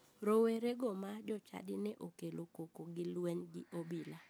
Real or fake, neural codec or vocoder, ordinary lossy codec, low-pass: real; none; none; none